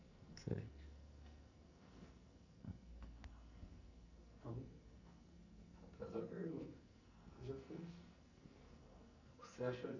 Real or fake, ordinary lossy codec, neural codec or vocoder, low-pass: fake; none; codec, 44.1 kHz, 2.6 kbps, SNAC; 7.2 kHz